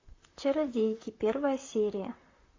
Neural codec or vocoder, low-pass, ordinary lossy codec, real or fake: vocoder, 44.1 kHz, 128 mel bands, Pupu-Vocoder; 7.2 kHz; AAC, 32 kbps; fake